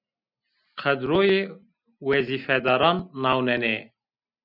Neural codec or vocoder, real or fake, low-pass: none; real; 5.4 kHz